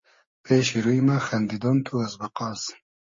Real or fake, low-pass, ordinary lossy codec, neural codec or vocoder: fake; 7.2 kHz; MP3, 32 kbps; codec, 16 kHz, 6 kbps, DAC